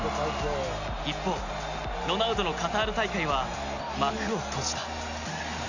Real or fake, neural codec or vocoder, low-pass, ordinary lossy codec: real; none; 7.2 kHz; none